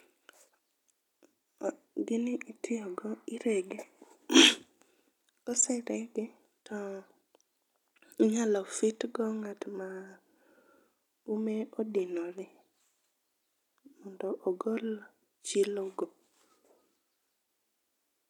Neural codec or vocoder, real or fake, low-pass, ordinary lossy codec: none; real; 19.8 kHz; none